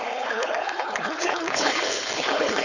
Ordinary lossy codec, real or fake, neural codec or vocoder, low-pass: none; fake; codec, 16 kHz, 4.8 kbps, FACodec; 7.2 kHz